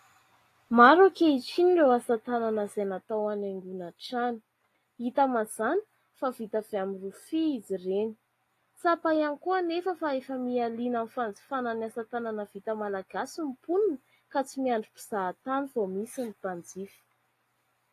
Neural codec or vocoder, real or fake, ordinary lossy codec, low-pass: none; real; AAC, 48 kbps; 14.4 kHz